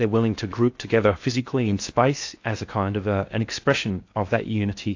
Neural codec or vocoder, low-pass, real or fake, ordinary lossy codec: codec, 16 kHz in and 24 kHz out, 0.6 kbps, FocalCodec, streaming, 2048 codes; 7.2 kHz; fake; AAC, 48 kbps